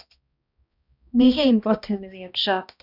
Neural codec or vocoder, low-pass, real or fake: codec, 16 kHz, 1 kbps, X-Codec, HuBERT features, trained on balanced general audio; 5.4 kHz; fake